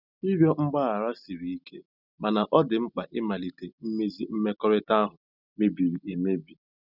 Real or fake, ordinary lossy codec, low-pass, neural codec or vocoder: real; none; 5.4 kHz; none